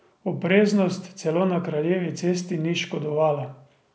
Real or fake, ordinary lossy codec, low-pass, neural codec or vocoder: real; none; none; none